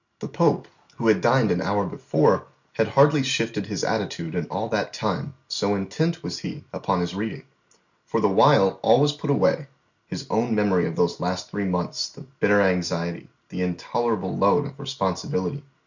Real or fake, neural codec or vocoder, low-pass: real; none; 7.2 kHz